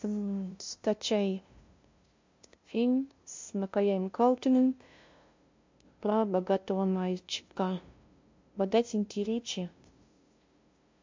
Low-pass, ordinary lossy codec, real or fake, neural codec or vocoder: 7.2 kHz; MP3, 48 kbps; fake; codec, 16 kHz, 0.5 kbps, FunCodec, trained on LibriTTS, 25 frames a second